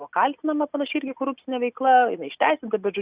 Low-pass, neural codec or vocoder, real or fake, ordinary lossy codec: 3.6 kHz; none; real; Opus, 64 kbps